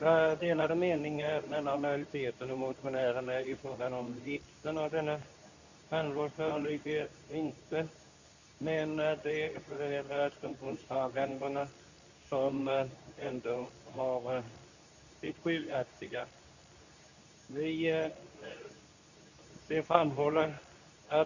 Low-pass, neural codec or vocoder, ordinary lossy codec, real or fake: 7.2 kHz; codec, 24 kHz, 0.9 kbps, WavTokenizer, medium speech release version 2; none; fake